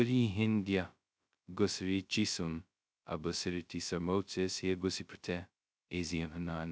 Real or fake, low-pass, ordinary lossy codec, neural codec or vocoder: fake; none; none; codec, 16 kHz, 0.2 kbps, FocalCodec